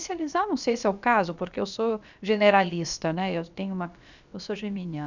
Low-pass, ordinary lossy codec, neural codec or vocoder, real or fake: 7.2 kHz; none; codec, 16 kHz, about 1 kbps, DyCAST, with the encoder's durations; fake